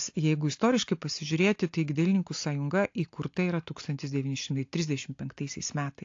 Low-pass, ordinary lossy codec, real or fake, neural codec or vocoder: 7.2 kHz; AAC, 48 kbps; real; none